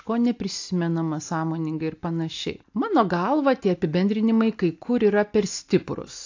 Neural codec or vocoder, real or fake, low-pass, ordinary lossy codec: none; real; 7.2 kHz; AAC, 48 kbps